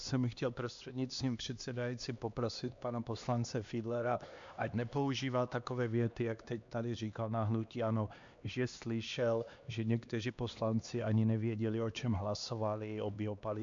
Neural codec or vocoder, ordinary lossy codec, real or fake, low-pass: codec, 16 kHz, 2 kbps, X-Codec, HuBERT features, trained on LibriSpeech; MP3, 64 kbps; fake; 7.2 kHz